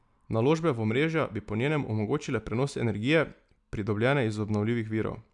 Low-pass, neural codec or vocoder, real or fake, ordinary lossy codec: 10.8 kHz; none; real; MP3, 96 kbps